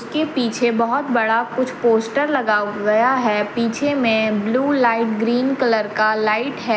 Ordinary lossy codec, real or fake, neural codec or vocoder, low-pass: none; real; none; none